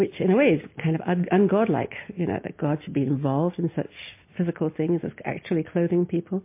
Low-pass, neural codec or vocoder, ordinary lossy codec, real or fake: 3.6 kHz; none; MP3, 24 kbps; real